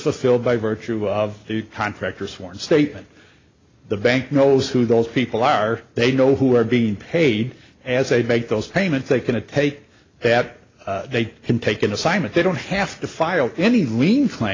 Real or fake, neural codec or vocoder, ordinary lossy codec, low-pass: real; none; AAC, 32 kbps; 7.2 kHz